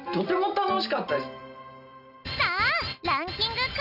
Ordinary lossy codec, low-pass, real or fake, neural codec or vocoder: none; 5.4 kHz; real; none